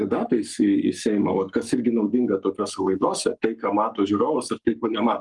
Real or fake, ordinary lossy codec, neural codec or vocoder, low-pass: fake; Opus, 32 kbps; codec, 44.1 kHz, 7.8 kbps, Pupu-Codec; 10.8 kHz